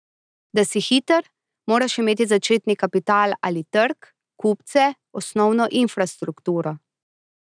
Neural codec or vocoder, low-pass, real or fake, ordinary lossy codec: none; 9.9 kHz; real; none